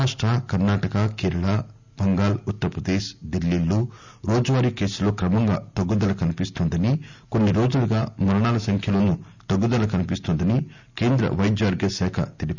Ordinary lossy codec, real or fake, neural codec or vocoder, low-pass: none; real; none; 7.2 kHz